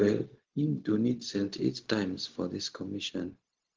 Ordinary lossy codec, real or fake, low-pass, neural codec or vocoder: Opus, 16 kbps; fake; 7.2 kHz; codec, 16 kHz, 0.4 kbps, LongCat-Audio-Codec